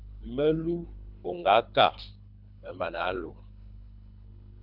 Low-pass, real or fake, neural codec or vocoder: 5.4 kHz; fake; codec, 24 kHz, 3 kbps, HILCodec